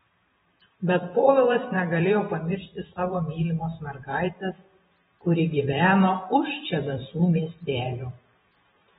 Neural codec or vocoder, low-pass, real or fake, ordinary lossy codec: vocoder, 44.1 kHz, 128 mel bands every 512 samples, BigVGAN v2; 19.8 kHz; fake; AAC, 16 kbps